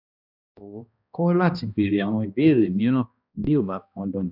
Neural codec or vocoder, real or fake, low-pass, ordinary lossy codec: codec, 16 kHz, 1 kbps, X-Codec, HuBERT features, trained on balanced general audio; fake; 5.4 kHz; none